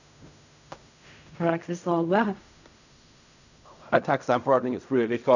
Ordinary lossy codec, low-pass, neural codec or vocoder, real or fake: none; 7.2 kHz; codec, 16 kHz in and 24 kHz out, 0.4 kbps, LongCat-Audio-Codec, fine tuned four codebook decoder; fake